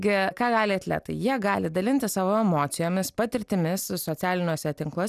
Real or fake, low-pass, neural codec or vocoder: real; 14.4 kHz; none